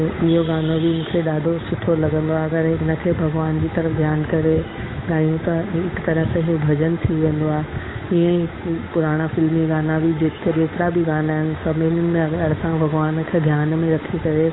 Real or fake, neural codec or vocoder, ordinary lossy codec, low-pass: fake; codec, 16 kHz, 8 kbps, FunCodec, trained on Chinese and English, 25 frames a second; AAC, 16 kbps; 7.2 kHz